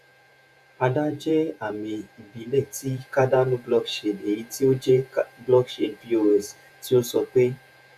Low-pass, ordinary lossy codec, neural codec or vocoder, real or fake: 14.4 kHz; none; vocoder, 48 kHz, 128 mel bands, Vocos; fake